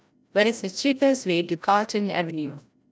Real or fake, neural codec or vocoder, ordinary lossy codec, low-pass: fake; codec, 16 kHz, 0.5 kbps, FreqCodec, larger model; none; none